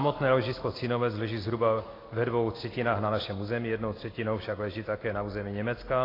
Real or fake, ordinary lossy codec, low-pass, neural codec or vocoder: real; AAC, 24 kbps; 5.4 kHz; none